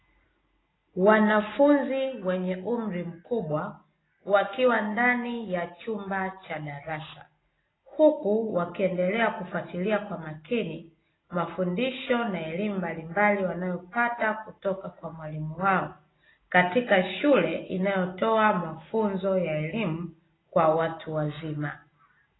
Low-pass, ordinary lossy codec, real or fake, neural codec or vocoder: 7.2 kHz; AAC, 16 kbps; real; none